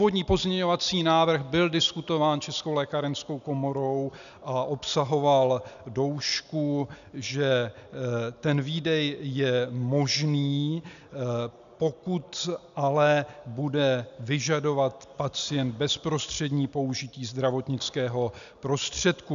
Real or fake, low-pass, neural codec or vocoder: real; 7.2 kHz; none